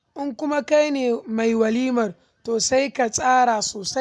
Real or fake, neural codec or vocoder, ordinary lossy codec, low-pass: real; none; none; none